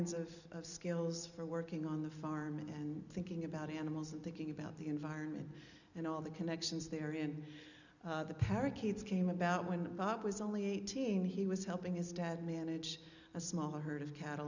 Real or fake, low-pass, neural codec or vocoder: real; 7.2 kHz; none